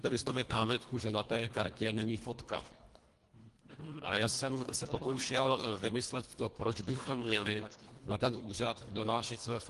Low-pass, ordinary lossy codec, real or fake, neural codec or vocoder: 10.8 kHz; Opus, 24 kbps; fake; codec, 24 kHz, 1.5 kbps, HILCodec